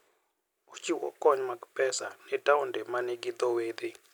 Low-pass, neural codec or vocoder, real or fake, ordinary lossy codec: none; none; real; none